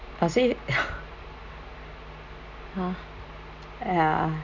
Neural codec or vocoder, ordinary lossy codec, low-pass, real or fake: none; none; 7.2 kHz; real